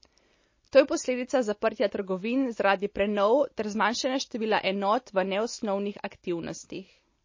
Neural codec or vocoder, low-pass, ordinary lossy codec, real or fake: none; 7.2 kHz; MP3, 32 kbps; real